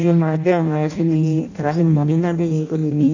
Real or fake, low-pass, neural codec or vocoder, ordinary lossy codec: fake; 7.2 kHz; codec, 16 kHz in and 24 kHz out, 0.6 kbps, FireRedTTS-2 codec; none